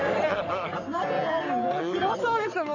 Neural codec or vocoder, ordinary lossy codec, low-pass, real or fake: codec, 44.1 kHz, 3.4 kbps, Pupu-Codec; none; 7.2 kHz; fake